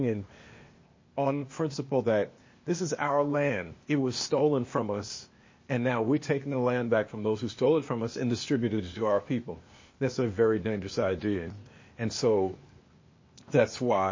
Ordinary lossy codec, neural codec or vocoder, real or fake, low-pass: MP3, 32 kbps; codec, 16 kHz, 0.8 kbps, ZipCodec; fake; 7.2 kHz